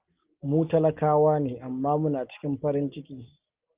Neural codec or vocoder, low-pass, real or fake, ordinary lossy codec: none; 3.6 kHz; real; Opus, 32 kbps